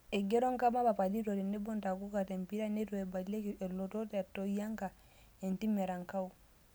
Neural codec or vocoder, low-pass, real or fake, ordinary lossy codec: none; none; real; none